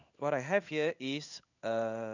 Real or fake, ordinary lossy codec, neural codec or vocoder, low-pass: fake; none; codec, 16 kHz in and 24 kHz out, 1 kbps, XY-Tokenizer; 7.2 kHz